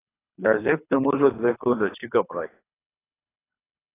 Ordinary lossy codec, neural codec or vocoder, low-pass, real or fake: AAC, 16 kbps; codec, 24 kHz, 3 kbps, HILCodec; 3.6 kHz; fake